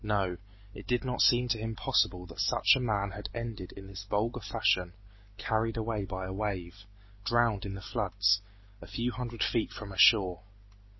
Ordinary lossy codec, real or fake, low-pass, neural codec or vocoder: MP3, 24 kbps; real; 7.2 kHz; none